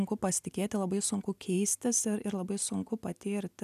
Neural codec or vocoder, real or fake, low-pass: none; real; 14.4 kHz